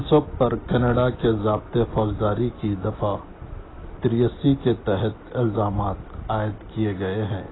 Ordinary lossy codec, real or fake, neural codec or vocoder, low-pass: AAC, 16 kbps; real; none; 7.2 kHz